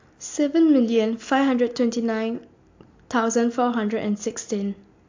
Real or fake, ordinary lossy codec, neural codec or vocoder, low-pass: real; AAC, 48 kbps; none; 7.2 kHz